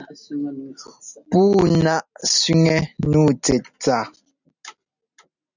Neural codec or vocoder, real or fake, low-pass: none; real; 7.2 kHz